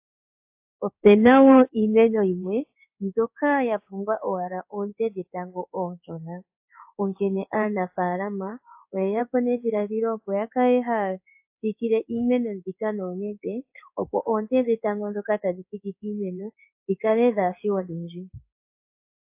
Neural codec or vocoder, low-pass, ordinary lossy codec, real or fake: codec, 16 kHz in and 24 kHz out, 1 kbps, XY-Tokenizer; 3.6 kHz; AAC, 32 kbps; fake